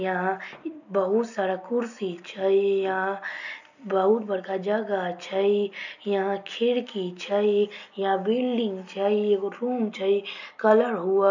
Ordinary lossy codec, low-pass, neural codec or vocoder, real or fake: none; 7.2 kHz; none; real